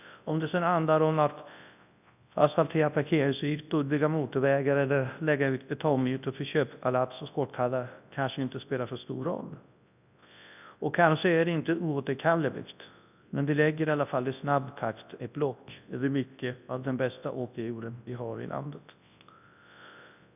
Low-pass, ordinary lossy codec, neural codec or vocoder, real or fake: 3.6 kHz; none; codec, 24 kHz, 0.9 kbps, WavTokenizer, large speech release; fake